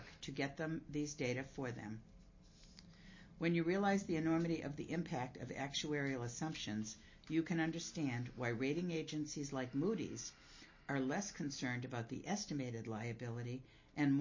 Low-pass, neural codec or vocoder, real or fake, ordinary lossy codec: 7.2 kHz; none; real; MP3, 32 kbps